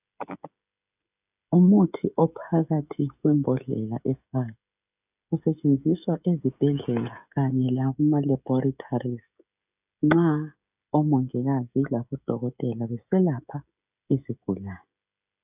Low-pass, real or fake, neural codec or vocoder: 3.6 kHz; fake; codec, 16 kHz, 16 kbps, FreqCodec, smaller model